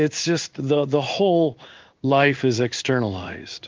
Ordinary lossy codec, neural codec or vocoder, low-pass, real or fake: Opus, 32 kbps; codec, 16 kHz in and 24 kHz out, 1 kbps, XY-Tokenizer; 7.2 kHz; fake